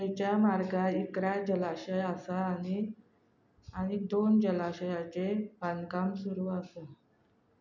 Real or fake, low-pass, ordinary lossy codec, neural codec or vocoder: real; 7.2 kHz; none; none